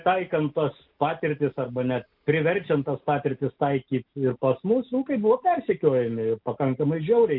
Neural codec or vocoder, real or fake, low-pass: none; real; 5.4 kHz